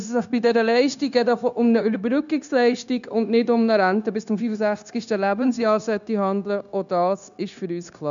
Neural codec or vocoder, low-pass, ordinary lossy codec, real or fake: codec, 16 kHz, 0.9 kbps, LongCat-Audio-Codec; 7.2 kHz; none; fake